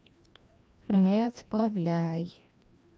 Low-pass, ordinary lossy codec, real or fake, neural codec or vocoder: none; none; fake; codec, 16 kHz, 1 kbps, FreqCodec, larger model